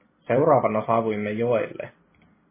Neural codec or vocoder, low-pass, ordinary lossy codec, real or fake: vocoder, 44.1 kHz, 128 mel bands every 256 samples, BigVGAN v2; 3.6 kHz; MP3, 16 kbps; fake